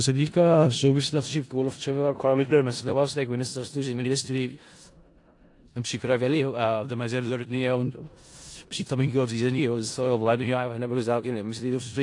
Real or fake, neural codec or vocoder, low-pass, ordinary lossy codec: fake; codec, 16 kHz in and 24 kHz out, 0.4 kbps, LongCat-Audio-Codec, four codebook decoder; 10.8 kHz; AAC, 48 kbps